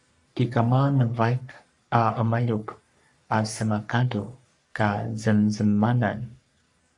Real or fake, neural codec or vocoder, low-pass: fake; codec, 44.1 kHz, 3.4 kbps, Pupu-Codec; 10.8 kHz